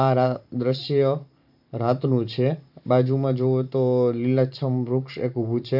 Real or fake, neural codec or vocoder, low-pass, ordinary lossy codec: fake; vocoder, 44.1 kHz, 128 mel bands every 512 samples, BigVGAN v2; 5.4 kHz; none